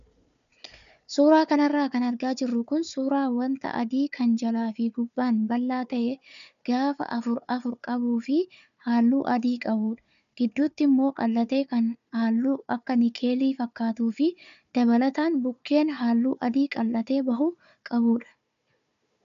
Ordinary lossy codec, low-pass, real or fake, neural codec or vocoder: AAC, 96 kbps; 7.2 kHz; fake; codec, 16 kHz, 4 kbps, FunCodec, trained on Chinese and English, 50 frames a second